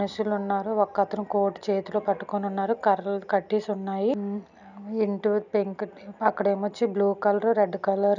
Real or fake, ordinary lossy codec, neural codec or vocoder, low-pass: real; none; none; 7.2 kHz